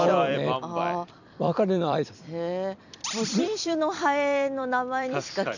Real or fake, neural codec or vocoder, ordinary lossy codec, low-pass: fake; vocoder, 44.1 kHz, 128 mel bands every 256 samples, BigVGAN v2; none; 7.2 kHz